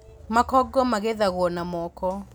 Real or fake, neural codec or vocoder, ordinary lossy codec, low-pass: real; none; none; none